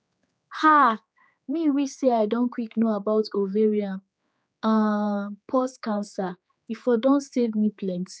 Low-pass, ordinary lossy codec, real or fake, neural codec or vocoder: none; none; fake; codec, 16 kHz, 4 kbps, X-Codec, HuBERT features, trained on general audio